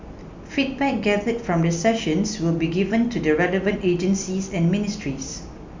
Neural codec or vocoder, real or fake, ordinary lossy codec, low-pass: none; real; MP3, 64 kbps; 7.2 kHz